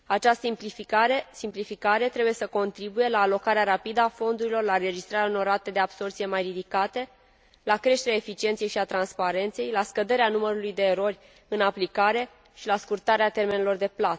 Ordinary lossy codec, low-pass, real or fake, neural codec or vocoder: none; none; real; none